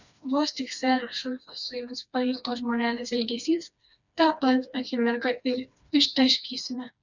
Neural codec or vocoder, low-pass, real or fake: codec, 16 kHz, 2 kbps, FreqCodec, smaller model; 7.2 kHz; fake